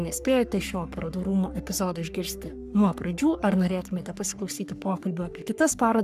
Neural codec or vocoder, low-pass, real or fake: codec, 44.1 kHz, 3.4 kbps, Pupu-Codec; 14.4 kHz; fake